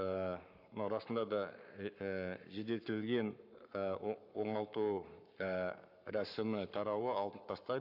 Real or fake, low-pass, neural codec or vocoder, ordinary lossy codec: fake; 5.4 kHz; codec, 44.1 kHz, 7.8 kbps, Pupu-Codec; AAC, 48 kbps